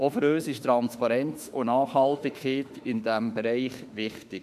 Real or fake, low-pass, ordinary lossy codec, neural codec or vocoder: fake; 14.4 kHz; AAC, 96 kbps; autoencoder, 48 kHz, 32 numbers a frame, DAC-VAE, trained on Japanese speech